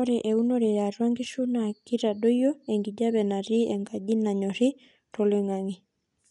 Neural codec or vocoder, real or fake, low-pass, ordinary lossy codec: none; real; 10.8 kHz; none